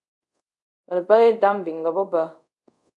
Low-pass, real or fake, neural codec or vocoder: 10.8 kHz; fake; codec, 24 kHz, 0.5 kbps, DualCodec